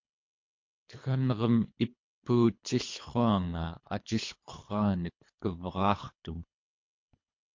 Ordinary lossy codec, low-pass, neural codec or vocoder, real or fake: MP3, 48 kbps; 7.2 kHz; codec, 24 kHz, 3 kbps, HILCodec; fake